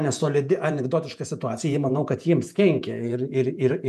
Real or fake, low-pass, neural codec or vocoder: fake; 14.4 kHz; vocoder, 48 kHz, 128 mel bands, Vocos